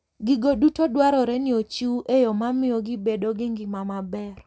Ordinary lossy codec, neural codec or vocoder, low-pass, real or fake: none; none; none; real